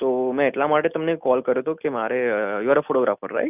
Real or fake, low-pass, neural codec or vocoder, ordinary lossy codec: real; 3.6 kHz; none; none